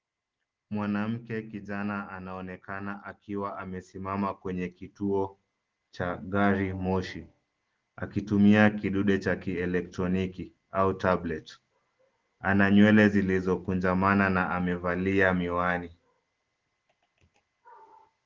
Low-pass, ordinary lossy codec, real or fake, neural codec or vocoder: 7.2 kHz; Opus, 16 kbps; real; none